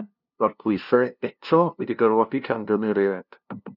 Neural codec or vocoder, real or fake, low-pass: codec, 16 kHz, 0.5 kbps, FunCodec, trained on LibriTTS, 25 frames a second; fake; 5.4 kHz